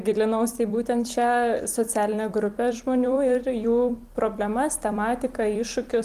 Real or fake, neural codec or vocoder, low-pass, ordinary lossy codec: fake; vocoder, 44.1 kHz, 128 mel bands every 256 samples, BigVGAN v2; 14.4 kHz; Opus, 24 kbps